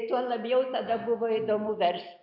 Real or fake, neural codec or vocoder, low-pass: real; none; 5.4 kHz